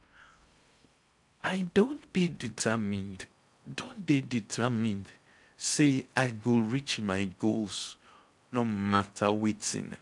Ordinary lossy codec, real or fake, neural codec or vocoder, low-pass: none; fake; codec, 16 kHz in and 24 kHz out, 0.6 kbps, FocalCodec, streaming, 4096 codes; 10.8 kHz